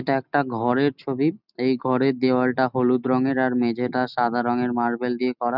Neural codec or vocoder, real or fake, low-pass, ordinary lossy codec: none; real; 5.4 kHz; none